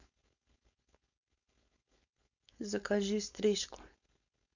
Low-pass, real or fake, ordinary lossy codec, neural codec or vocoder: 7.2 kHz; fake; none; codec, 16 kHz, 4.8 kbps, FACodec